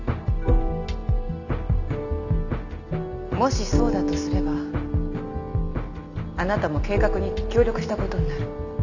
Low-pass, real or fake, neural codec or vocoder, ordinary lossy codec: 7.2 kHz; real; none; none